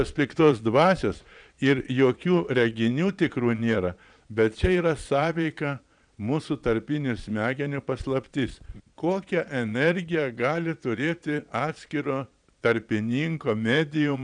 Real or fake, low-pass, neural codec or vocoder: fake; 9.9 kHz; vocoder, 22.05 kHz, 80 mel bands, Vocos